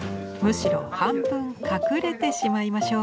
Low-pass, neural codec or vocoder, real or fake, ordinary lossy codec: none; none; real; none